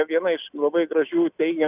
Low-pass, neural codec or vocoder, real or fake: 3.6 kHz; none; real